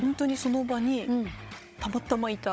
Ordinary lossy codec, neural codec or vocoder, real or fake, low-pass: none; codec, 16 kHz, 16 kbps, FunCodec, trained on Chinese and English, 50 frames a second; fake; none